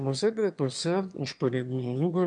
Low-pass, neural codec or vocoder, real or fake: 9.9 kHz; autoencoder, 22.05 kHz, a latent of 192 numbers a frame, VITS, trained on one speaker; fake